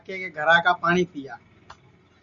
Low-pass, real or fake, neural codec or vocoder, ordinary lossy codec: 7.2 kHz; real; none; Opus, 64 kbps